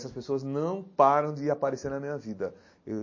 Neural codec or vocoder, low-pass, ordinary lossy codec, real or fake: none; 7.2 kHz; MP3, 32 kbps; real